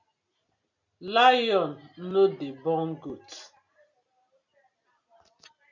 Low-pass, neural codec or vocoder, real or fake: 7.2 kHz; none; real